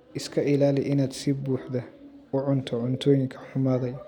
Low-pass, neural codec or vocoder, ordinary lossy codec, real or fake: 19.8 kHz; none; none; real